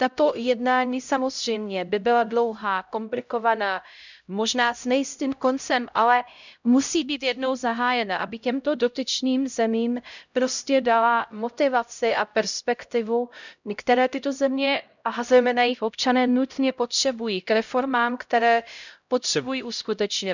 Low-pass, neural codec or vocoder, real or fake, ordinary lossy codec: 7.2 kHz; codec, 16 kHz, 0.5 kbps, X-Codec, HuBERT features, trained on LibriSpeech; fake; none